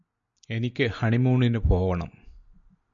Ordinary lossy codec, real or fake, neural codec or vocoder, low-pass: MP3, 96 kbps; real; none; 7.2 kHz